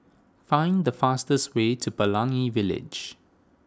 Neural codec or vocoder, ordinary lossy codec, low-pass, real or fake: none; none; none; real